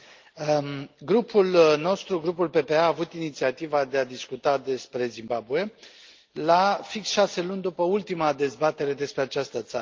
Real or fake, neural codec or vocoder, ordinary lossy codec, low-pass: real; none; Opus, 32 kbps; 7.2 kHz